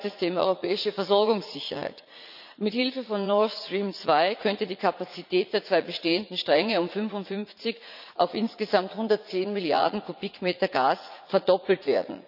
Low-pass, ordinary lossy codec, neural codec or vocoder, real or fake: 5.4 kHz; none; vocoder, 44.1 kHz, 80 mel bands, Vocos; fake